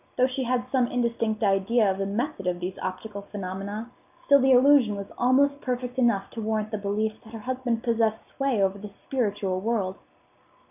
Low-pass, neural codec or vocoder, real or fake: 3.6 kHz; none; real